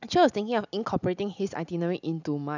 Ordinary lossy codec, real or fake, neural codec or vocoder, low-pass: none; real; none; 7.2 kHz